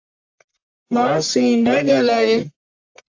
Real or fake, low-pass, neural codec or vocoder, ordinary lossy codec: fake; 7.2 kHz; codec, 44.1 kHz, 1.7 kbps, Pupu-Codec; AAC, 48 kbps